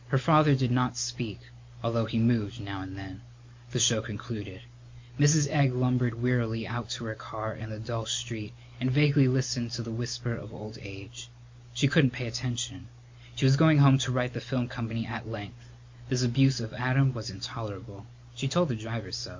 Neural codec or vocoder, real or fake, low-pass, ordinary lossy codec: none; real; 7.2 kHz; MP3, 48 kbps